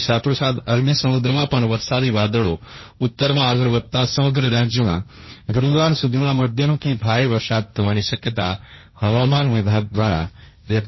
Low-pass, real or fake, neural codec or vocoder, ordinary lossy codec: 7.2 kHz; fake; codec, 16 kHz, 1.1 kbps, Voila-Tokenizer; MP3, 24 kbps